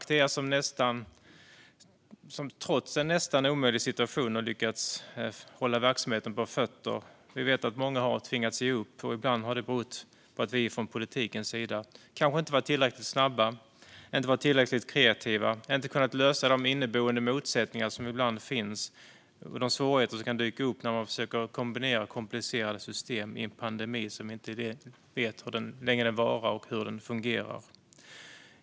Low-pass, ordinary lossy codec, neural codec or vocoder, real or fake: none; none; none; real